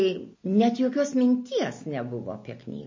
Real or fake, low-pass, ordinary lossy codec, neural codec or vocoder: real; 7.2 kHz; MP3, 32 kbps; none